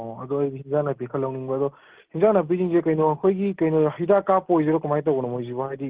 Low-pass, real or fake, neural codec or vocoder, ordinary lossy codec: 3.6 kHz; real; none; Opus, 16 kbps